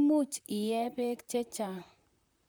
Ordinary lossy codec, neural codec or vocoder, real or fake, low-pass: none; vocoder, 44.1 kHz, 128 mel bands, Pupu-Vocoder; fake; none